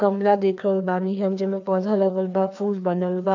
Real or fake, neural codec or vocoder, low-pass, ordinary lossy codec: fake; codec, 16 kHz, 2 kbps, FreqCodec, larger model; 7.2 kHz; none